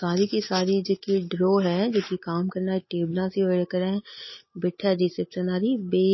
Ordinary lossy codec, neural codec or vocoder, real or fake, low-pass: MP3, 24 kbps; none; real; 7.2 kHz